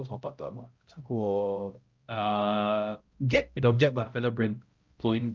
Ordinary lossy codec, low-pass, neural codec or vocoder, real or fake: Opus, 24 kbps; 7.2 kHz; codec, 16 kHz, 0.5 kbps, X-Codec, HuBERT features, trained on balanced general audio; fake